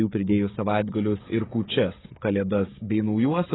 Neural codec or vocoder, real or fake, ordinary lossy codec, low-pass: codec, 16 kHz, 16 kbps, FreqCodec, smaller model; fake; AAC, 16 kbps; 7.2 kHz